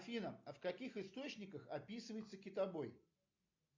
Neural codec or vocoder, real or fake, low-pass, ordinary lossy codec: none; real; 7.2 kHz; MP3, 64 kbps